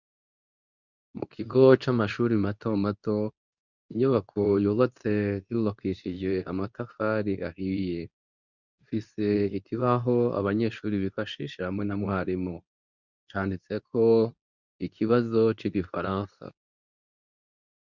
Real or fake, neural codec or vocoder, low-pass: fake; codec, 24 kHz, 0.9 kbps, WavTokenizer, medium speech release version 2; 7.2 kHz